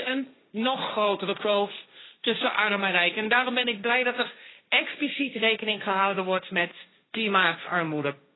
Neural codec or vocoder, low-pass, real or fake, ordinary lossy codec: codec, 16 kHz, 1.1 kbps, Voila-Tokenizer; 7.2 kHz; fake; AAC, 16 kbps